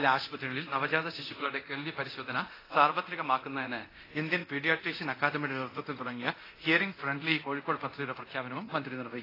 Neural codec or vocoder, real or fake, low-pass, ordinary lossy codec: codec, 24 kHz, 0.9 kbps, DualCodec; fake; 5.4 kHz; AAC, 24 kbps